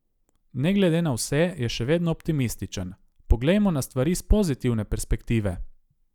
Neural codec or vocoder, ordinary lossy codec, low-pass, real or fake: none; none; 19.8 kHz; real